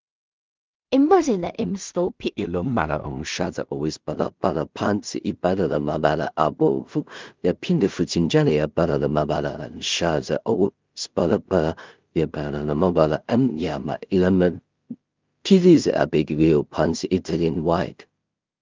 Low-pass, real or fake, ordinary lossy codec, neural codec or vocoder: 7.2 kHz; fake; Opus, 24 kbps; codec, 16 kHz in and 24 kHz out, 0.4 kbps, LongCat-Audio-Codec, two codebook decoder